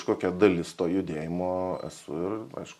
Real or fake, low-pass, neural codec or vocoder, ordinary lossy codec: real; 14.4 kHz; none; MP3, 64 kbps